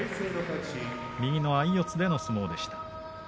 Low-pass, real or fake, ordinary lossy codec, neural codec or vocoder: none; real; none; none